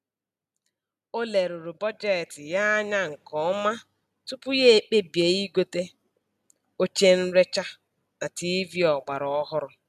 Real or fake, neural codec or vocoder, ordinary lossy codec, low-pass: real; none; none; 14.4 kHz